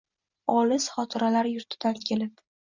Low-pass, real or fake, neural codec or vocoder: 7.2 kHz; real; none